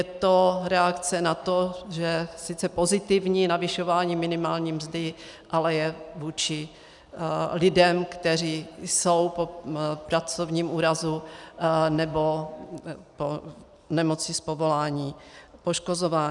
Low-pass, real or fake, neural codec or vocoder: 10.8 kHz; real; none